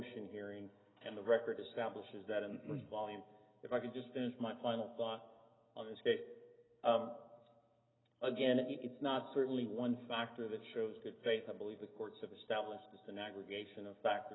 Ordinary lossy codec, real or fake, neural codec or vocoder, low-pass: AAC, 16 kbps; real; none; 7.2 kHz